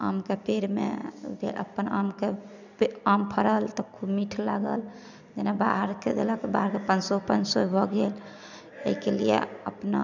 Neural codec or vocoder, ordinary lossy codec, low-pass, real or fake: none; none; 7.2 kHz; real